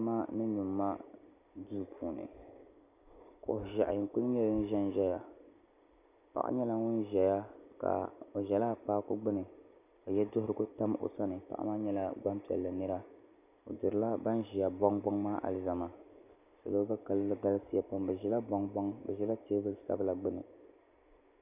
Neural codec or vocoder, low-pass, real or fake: none; 3.6 kHz; real